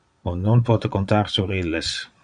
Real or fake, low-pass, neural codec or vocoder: fake; 9.9 kHz; vocoder, 22.05 kHz, 80 mel bands, Vocos